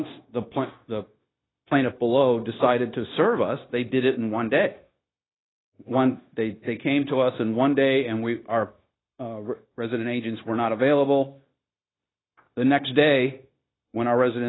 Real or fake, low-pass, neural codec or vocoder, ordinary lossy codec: fake; 7.2 kHz; codec, 16 kHz, 0.9 kbps, LongCat-Audio-Codec; AAC, 16 kbps